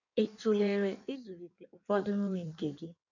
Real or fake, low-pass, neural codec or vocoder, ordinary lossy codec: fake; 7.2 kHz; codec, 16 kHz in and 24 kHz out, 1.1 kbps, FireRedTTS-2 codec; none